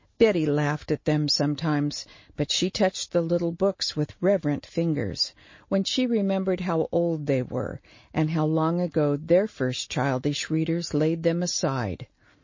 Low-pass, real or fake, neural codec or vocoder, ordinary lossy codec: 7.2 kHz; real; none; MP3, 32 kbps